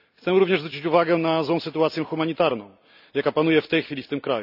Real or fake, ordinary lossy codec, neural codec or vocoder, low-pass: real; none; none; 5.4 kHz